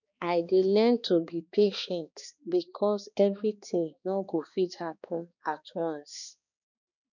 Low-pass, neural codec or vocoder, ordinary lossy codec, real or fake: 7.2 kHz; codec, 16 kHz, 2 kbps, X-Codec, HuBERT features, trained on balanced general audio; none; fake